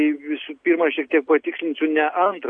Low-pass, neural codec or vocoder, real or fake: 9.9 kHz; none; real